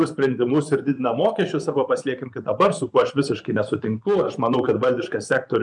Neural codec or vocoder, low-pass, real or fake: vocoder, 24 kHz, 100 mel bands, Vocos; 10.8 kHz; fake